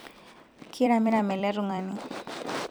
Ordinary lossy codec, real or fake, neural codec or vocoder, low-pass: none; fake; vocoder, 44.1 kHz, 128 mel bands every 256 samples, BigVGAN v2; none